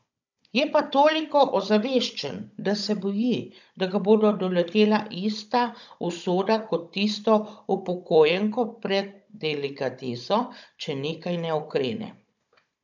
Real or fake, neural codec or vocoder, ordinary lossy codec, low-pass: fake; codec, 16 kHz, 16 kbps, FunCodec, trained on Chinese and English, 50 frames a second; none; 7.2 kHz